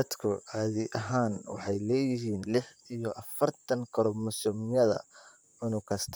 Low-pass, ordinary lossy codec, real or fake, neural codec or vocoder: none; none; fake; vocoder, 44.1 kHz, 128 mel bands, Pupu-Vocoder